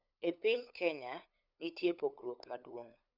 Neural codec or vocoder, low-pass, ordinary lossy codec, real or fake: codec, 16 kHz, 8 kbps, FunCodec, trained on LibriTTS, 25 frames a second; 5.4 kHz; none; fake